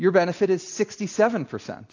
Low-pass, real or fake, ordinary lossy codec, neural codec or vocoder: 7.2 kHz; real; AAC, 48 kbps; none